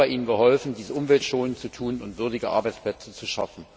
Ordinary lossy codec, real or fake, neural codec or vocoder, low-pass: none; real; none; none